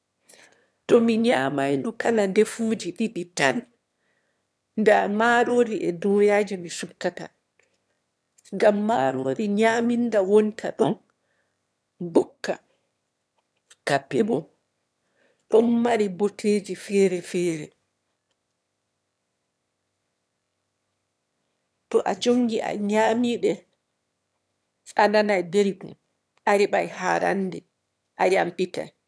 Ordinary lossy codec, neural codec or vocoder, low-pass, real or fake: none; autoencoder, 22.05 kHz, a latent of 192 numbers a frame, VITS, trained on one speaker; none; fake